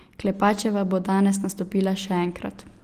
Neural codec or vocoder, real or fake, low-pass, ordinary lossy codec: none; real; 14.4 kHz; Opus, 24 kbps